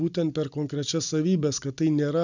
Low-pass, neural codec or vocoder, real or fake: 7.2 kHz; none; real